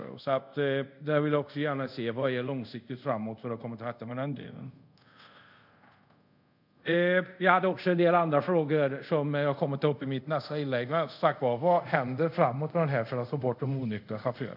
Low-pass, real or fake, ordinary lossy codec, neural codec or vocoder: 5.4 kHz; fake; none; codec, 24 kHz, 0.5 kbps, DualCodec